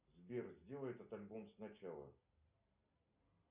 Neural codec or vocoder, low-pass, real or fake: none; 3.6 kHz; real